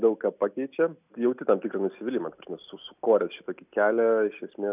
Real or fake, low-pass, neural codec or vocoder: real; 3.6 kHz; none